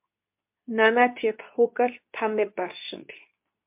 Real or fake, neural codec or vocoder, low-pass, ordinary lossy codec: fake; codec, 24 kHz, 0.9 kbps, WavTokenizer, medium speech release version 2; 3.6 kHz; MP3, 32 kbps